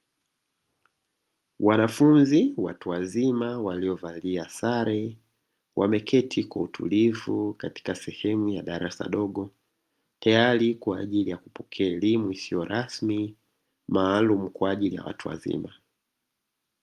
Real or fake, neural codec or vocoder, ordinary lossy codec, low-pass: real; none; Opus, 32 kbps; 14.4 kHz